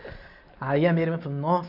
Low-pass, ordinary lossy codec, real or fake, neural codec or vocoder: 5.4 kHz; none; real; none